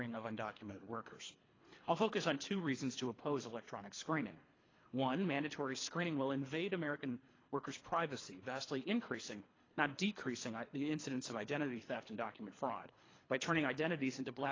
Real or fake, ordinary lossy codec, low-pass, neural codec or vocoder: fake; AAC, 32 kbps; 7.2 kHz; codec, 24 kHz, 3 kbps, HILCodec